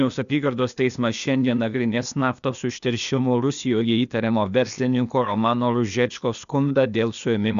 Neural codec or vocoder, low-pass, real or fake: codec, 16 kHz, 0.8 kbps, ZipCodec; 7.2 kHz; fake